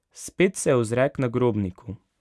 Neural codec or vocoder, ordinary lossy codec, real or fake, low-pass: none; none; real; none